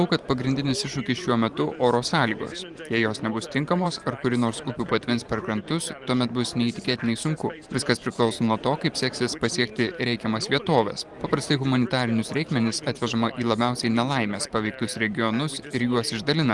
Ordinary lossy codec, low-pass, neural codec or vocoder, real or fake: Opus, 24 kbps; 10.8 kHz; vocoder, 44.1 kHz, 128 mel bands every 512 samples, BigVGAN v2; fake